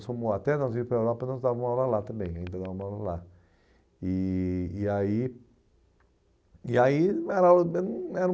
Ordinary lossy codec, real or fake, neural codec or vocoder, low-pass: none; real; none; none